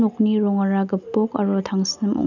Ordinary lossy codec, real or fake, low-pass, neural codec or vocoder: none; real; 7.2 kHz; none